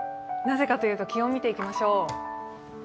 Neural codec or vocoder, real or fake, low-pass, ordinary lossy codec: none; real; none; none